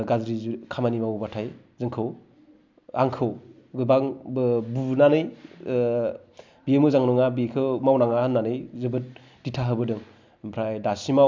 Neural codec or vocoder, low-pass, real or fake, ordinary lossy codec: none; 7.2 kHz; real; MP3, 64 kbps